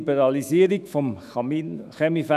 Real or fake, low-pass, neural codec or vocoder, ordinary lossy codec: fake; 14.4 kHz; autoencoder, 48 kHz, 128 numbers a frame, DAC-VAE, trained on Japanese speech; none